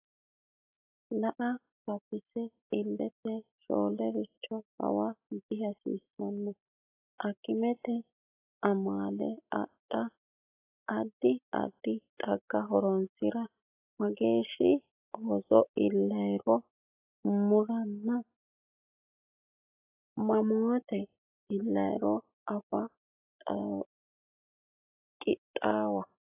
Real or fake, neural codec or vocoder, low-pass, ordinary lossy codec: real; none; 3.6 kHz; AAC, 32 kbps